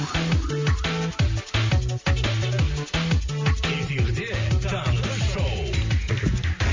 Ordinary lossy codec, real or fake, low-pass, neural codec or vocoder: MP3, 48 kbps; real; 7.2 kHz; none